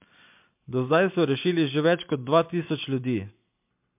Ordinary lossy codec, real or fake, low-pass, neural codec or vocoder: MP3, 32 kbps; real; 3.6 kHz; none